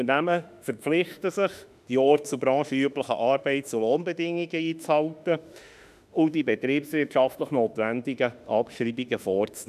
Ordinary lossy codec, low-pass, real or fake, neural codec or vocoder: none; 14.4 kHz; fake; autoencoder, 48 kHz, 32 numbers a frame, DAC-VAE, trained on Japanese speech